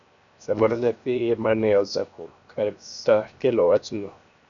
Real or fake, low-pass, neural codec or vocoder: fake; 7.2 kHz; codec, 16 kHz, 0.7 kbps, FocalCodec